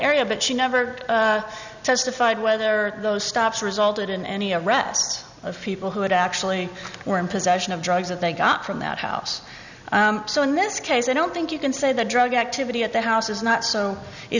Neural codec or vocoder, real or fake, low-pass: none; real; 7.2 kHz